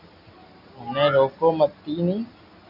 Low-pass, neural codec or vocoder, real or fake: 5.4 kHz; none; real